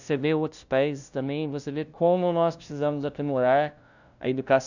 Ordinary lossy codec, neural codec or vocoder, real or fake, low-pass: none; codec, 16 kHz, 0.5 kbps, FunCodec, trained on LibriTTS, 25 frames a second; fake; 7.2 kHz